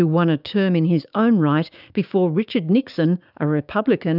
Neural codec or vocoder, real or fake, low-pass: codec, 16 kHz, 6 kbps, DAC; fake; 5.4 kHz